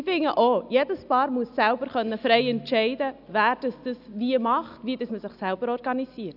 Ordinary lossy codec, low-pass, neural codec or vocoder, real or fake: none; 5.4 kHz; none; real